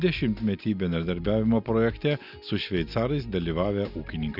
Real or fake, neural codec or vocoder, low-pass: real; none; 5.4 kHz